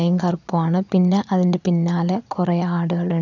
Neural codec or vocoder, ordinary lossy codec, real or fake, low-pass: vocoder, 22.05 kHz, 80 mel bands, Vocos; none; fake; 7.2 kHz